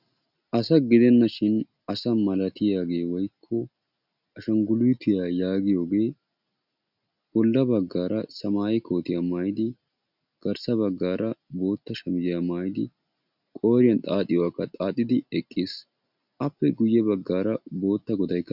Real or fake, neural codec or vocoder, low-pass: real; none; 5.4 kHz